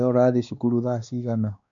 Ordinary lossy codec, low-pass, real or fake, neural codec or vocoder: MP3, 64 kbps; 7.2 kHz; fake; codec, 16 kHz, 2 kbps, X-Codec, WavLM features, trained on Multilingual LibriSpeech